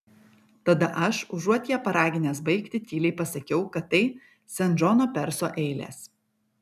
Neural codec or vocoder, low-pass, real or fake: none; 14.4 kHz; real